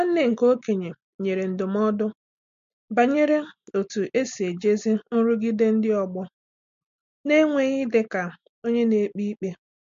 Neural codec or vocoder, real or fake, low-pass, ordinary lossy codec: none; real; 7.2 kHz; MP3, 48 kbps